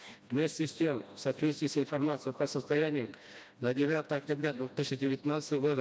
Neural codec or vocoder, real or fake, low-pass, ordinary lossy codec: codec, 16 kHz, 1 kbps, FreqCodec, smaller model; fake; none; none